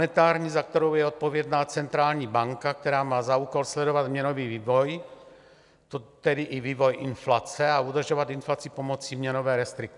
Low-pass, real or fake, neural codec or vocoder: 10.8 kHz; real; none